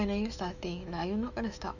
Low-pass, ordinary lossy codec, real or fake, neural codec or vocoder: 7.2 kHz; none; fake; autoencoder, 48 kHz, 128 numbers a frame, DAC-VAE, trained on Japanese speech